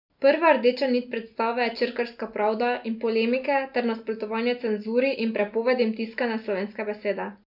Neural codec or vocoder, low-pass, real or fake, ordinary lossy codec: none; 5.4 kHz; real; none